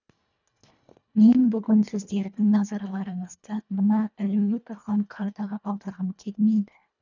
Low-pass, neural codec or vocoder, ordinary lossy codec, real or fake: 7.2 kHz; codec, 24 kHz, 1.5 kbps, HILCodec; none; fake